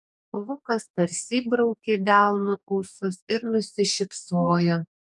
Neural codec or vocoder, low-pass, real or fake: codec, 44.1 kHz, 2.6 kbps, DAC; 10.8 kHz; fake